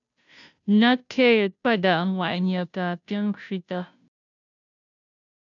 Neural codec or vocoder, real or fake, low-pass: codec, 16 kHz, 0.5 kbps, FunCodec, trained on Chinese and English, 25 frames a second; fake; 7.2 kHz